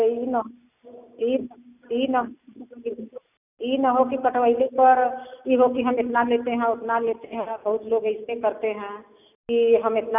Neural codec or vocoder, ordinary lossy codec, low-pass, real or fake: none; none; 3.6 kHz; real